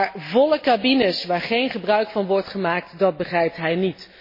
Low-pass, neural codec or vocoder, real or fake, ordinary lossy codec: 5.4 kHz; none; real; MP3, 24 kbps